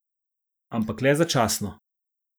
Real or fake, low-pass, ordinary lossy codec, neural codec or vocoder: real; none; none; none